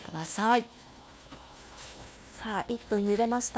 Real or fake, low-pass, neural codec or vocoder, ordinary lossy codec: fake; none; codec, 16 kHz, 1 kbps, FunCodec, trained on Chinese and English, 50 frames a second; none